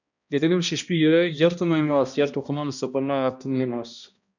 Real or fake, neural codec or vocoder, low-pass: fake; codec, 16 kHz, 1 kbps, X-Codec, HuBERT features, trained on balanced general audio; 7.2 kHz